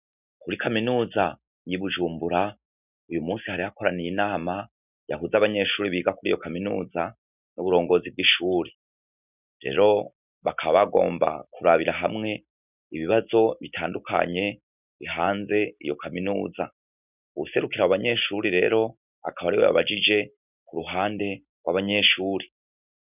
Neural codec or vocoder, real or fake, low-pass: none; real; 3.6 kHz